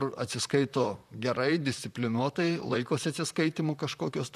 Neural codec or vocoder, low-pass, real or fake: vocoder, 44.1 kHz, 128 mel bands, Pupu-Vocoder; 14.4 kHz; fake